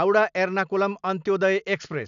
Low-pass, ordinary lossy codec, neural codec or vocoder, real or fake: 7.2 kHz; none; none; real